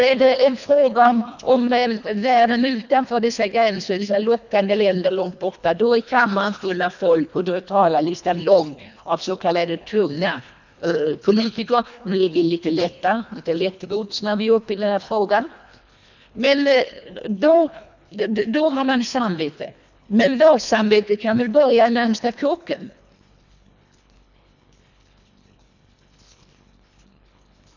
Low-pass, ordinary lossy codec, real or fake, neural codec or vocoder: 7.2 kHz; none; fake; codec, 24 kHz, 1.5 kbps, HILCodec